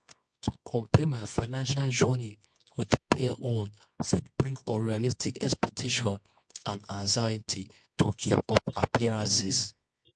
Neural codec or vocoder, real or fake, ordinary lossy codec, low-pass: codec, 24 kHz, 0.9 kbps, WavTokenizer, medium music audio release; fake; MP3, 64 kbps; 10.8 kHz